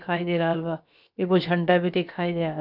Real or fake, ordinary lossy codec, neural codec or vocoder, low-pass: fake; none; codec, 16 kHz, about 1 kbps, DyCAST, with the encoder's durations; 5.4 kHz